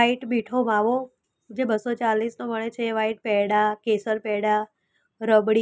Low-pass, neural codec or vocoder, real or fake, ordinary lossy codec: none; none; real; none